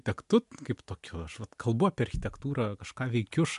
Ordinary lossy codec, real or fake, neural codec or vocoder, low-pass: MP3, 64 kbps; real; none; 10.8 kHz